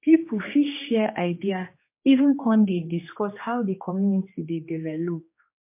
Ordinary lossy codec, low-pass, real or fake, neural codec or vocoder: MP3, 24 kbps; 3.6 kHz; fake; codec, 16 kHz, 2 kbps, X-Codec, HuBERT features, trained on general audio